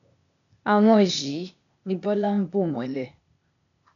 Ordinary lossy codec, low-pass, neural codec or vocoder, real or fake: none; 7.2 kHz; codec, 16 kHz, 0.8 kbps, ZipCodec; fake